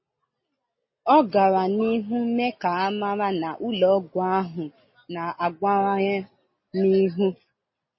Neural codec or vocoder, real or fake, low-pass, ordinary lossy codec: none; real; 7.2 kHz; MP3, 24 kbps